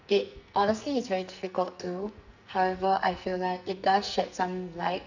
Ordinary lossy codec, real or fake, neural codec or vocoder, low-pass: none; fake; codec, 44.1 kHz, 2.6 kbps, SNAC; 7.2 kHz